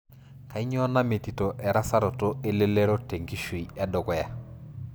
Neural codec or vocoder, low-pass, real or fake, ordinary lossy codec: none; none; real; none